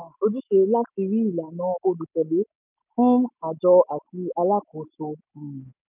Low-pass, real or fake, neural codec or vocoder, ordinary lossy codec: 3.6 kHz; real; none; none